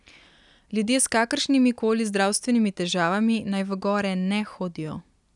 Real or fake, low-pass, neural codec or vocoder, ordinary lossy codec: real; 10.8 kHz; none; none